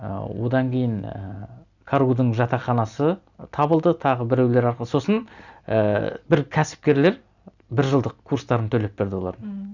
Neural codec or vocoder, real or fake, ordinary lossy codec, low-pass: none; real; none; 7.2 kHz